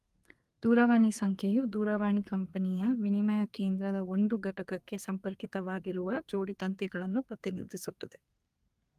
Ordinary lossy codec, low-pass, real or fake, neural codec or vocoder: Opus, 32 kbps; 14.4 kHz; fake; codec, 32 kHz, 1.9 kbps, SNAC